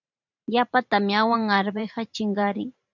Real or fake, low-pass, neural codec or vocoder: fake; 7.2 kHz; vocoder, 44.1 kHz, 128 mel bands every 512 samples, BigVGAN v2